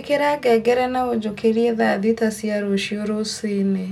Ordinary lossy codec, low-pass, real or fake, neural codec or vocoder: none; 19.8 kHz; real; none